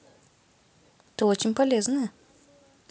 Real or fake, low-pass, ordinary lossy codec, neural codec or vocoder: real; none; none; none